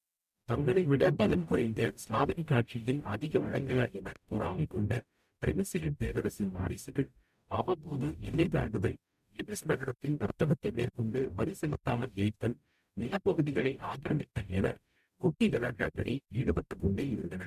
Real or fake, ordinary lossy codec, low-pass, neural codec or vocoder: fake; MP3, 96 kbps; 14.4 kHz; codec, 44.1 kHz, 0.9 kbps, DAC